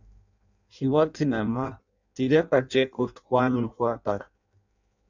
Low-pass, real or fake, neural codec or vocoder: 7.2 kHz; fake; codec, 16 kHz in and 24 kHz out, 0.6 kbps, FireRedTTS-2 codec